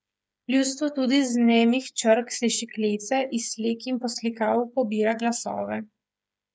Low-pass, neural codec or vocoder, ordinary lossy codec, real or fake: none; codec, 16 kHz, 8 kbps, FreqCodec, smaller model; none; fake